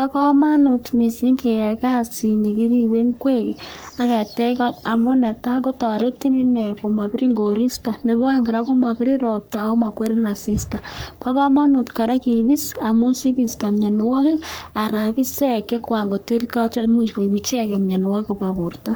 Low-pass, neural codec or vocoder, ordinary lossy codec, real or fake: none; codec, 44.1 kHz, 3.4 kbps, Pupu-Codec; none; fake